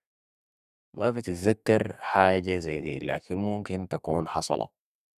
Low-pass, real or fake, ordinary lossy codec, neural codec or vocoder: 14.4 kHz; fake; none; codec, 32 kHz, 1.9 kbps, SNAC